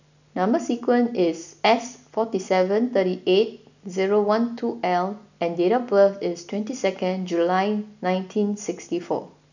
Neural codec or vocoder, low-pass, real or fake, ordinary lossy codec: none; 7.2 kHz; real; none